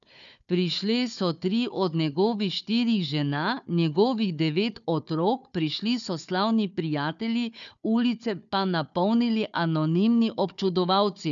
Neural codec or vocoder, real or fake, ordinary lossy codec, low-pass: codec, 16 kHz, 4 kbps, FunCodec, trained on Chinese and English, 50 frames a second; fake; none; 7.2 kHz